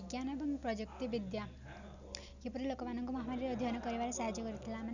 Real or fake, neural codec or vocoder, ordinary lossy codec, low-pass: real; none; none; 7.2 kHz